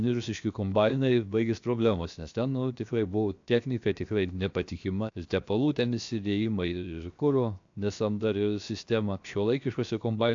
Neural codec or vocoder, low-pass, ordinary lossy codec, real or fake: codec, 16 kHz, 0.7 kbps, FocalCodec; 7.2 kHz; AAC, 64 kbps; fake